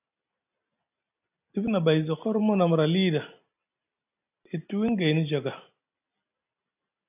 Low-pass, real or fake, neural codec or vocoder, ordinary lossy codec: 3.6 kHz; real; none; AAC, 32 kbps